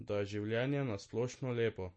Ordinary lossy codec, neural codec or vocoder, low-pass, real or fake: MP3, 32 kbps; none; 9.9 kHz; real